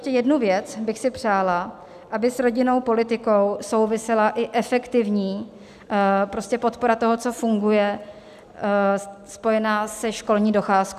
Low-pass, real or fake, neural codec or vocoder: 14.4 kHz; real; none